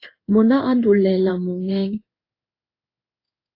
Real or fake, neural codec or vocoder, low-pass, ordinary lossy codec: fake; codec, 16 kHz in and 24 kHz out, 1 kbps, XY-Tokenizer; 5.4 kHz; AAC, 24 kbps